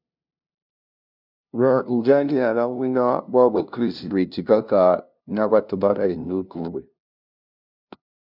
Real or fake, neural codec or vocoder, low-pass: fake; codec, 16 kHz, 0.5 kbps, FunCodec, trained on LibriTTS, 25 frames a second; 5.4 kHz